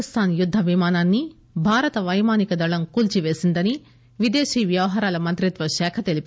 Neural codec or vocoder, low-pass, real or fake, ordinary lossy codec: none; none; real; none